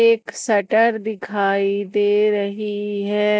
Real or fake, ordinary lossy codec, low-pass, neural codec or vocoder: real; none; none; none